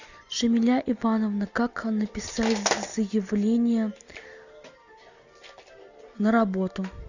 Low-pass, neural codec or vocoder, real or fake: 7.2 kHz; none; real